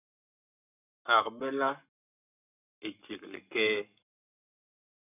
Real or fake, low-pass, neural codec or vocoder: fake; 3.6 kHz; codec, 44.1 kHz, 7.8 kbps, Pupu-Codec